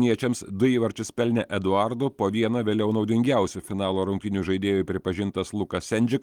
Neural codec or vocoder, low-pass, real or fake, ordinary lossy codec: none; 19.8 kHz; real; Opus, 24 kbps